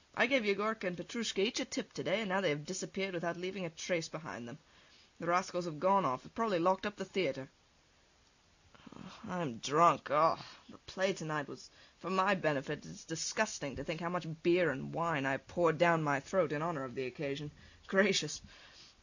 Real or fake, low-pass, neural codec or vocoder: real; 7.2 kHz; none